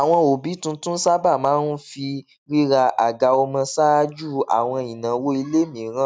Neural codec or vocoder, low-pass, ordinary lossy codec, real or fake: none; none; none; real